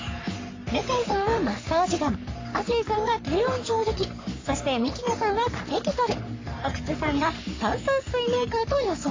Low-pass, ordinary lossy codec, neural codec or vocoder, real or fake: 7.2 kHz; AAC, 32 kbps; codec, 44.1 kHz, 3.4 kbps, Pupu-Codec; fake